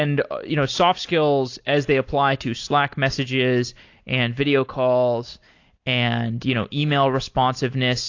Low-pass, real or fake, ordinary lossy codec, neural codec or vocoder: 7.2 kHz; real; AAC, 48 kbps; none